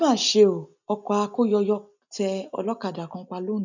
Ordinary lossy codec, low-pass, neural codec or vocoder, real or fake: none; 7.2 kHz; none; real